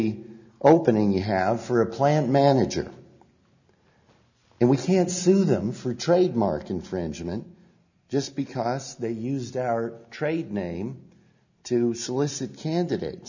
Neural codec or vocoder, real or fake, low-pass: none; real; 7.2 kHz